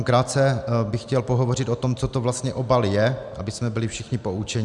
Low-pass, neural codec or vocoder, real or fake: 10.8 kHz; none; real